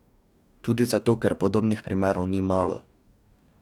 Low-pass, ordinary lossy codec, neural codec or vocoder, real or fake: 19.8 kHz; none; codec, 44.1 kHz, 2.6 kbps, DAC; fake